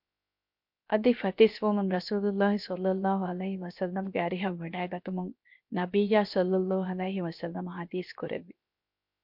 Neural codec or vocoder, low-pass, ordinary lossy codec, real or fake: codec, 16 kHz, 0.7 kbps, FocalCodec; 5.4 kHz; MP3, 48 kbps; fake